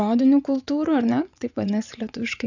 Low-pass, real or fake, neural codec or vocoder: 7.2 kHz; fake; vocoder, 24 kHz, 100 mel bands, Vocos